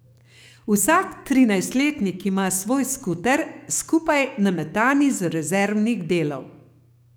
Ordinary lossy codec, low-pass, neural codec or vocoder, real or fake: none; none; codec, 44.1 kHz, 7.8 kbps, DAC; fake